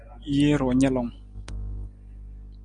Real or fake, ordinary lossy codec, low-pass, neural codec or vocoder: real; Opus, 32 kbps; 9.9 kHz; none